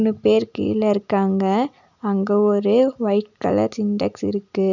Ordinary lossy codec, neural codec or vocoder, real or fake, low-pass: none; none; real; 7.2 kHz